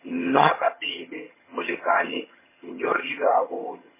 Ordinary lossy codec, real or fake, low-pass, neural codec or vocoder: MP3, 16 kbps; fake; 3.6 kHz; vocoder, 22.05 kHz, 80 mel bands, HiFi-GAN